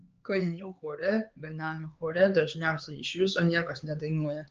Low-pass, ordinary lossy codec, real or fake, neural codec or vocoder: 7.2 kHz; Opus, 24 kbps; fake; codec, 16 kHz, 4 kbps, X-Codec, HuBERT features, trained on LibriSpeech